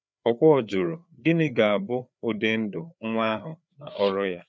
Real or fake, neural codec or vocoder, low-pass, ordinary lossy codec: fake; codec, 16 kHz, 4 kbps, FreqCodec, larger model; none; none